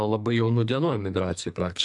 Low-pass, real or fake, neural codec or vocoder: 10.8 kHz; fake; codec, 44.1 kHz, 2.6 kbps, SNAC